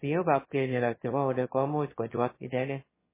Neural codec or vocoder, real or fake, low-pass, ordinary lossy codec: autoencoder, 22.05 kHz, a latent of 192 numbers a frame, VITS, trained on one speaker; fake; 3.6 kHz; MP3, 16 kbps